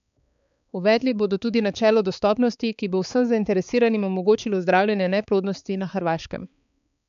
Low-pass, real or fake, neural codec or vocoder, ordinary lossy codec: 7.2 kHz; fake; codec, 16 kHz, 4 kbps, X-Codec, HuBERT features, trained on balanced general audio; none